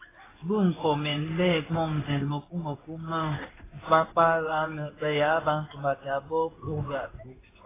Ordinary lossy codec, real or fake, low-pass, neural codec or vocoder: AAC, 16 kbps; fake; 3.6 kHz; codec, 16 kHz in and 24 kHz out, 1 kbps, XY-Tokenizer